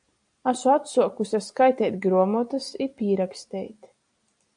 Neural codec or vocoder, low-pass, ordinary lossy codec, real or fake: none; 9.9 kHz; AAC, 64 kbps; real